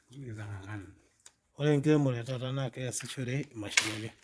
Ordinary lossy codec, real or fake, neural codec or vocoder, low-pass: none; fake; vocoder, 22.05 kHz, 80 mel bands, Vocos; none